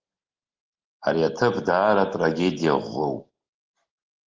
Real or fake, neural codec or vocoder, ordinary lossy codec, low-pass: real; none; Opus, 16 kbps; 7.2 kHz